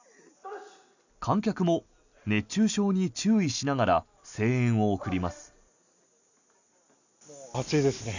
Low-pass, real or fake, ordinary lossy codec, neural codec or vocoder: 7.2 kHz; real; none; none